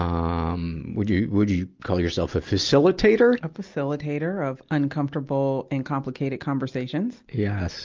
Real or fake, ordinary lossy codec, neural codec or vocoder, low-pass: real; Opus, 32 kbps; none; 7.2 kHz